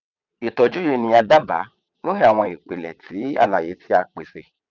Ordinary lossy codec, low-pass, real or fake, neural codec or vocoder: none; 7.2 kHz; fake; vocoder, 22.05 kHz, 80 mel bands, WaveNeXt